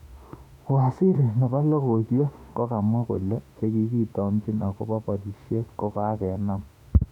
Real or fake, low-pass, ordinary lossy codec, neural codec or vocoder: fake; 19.8 kHz; none; autoencoder, 48 kHz, 32 numbers a frame, DAC-VAE, trained on Japanese speech